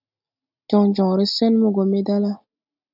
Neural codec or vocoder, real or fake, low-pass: none; real; 5.4 kHz